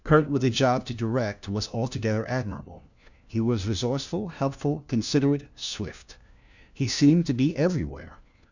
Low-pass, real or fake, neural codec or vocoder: 7.2 kHz; fake; codec, 16 kHz, 1 kbps, FunCodec, trained on LibriTTS, 50 frames a second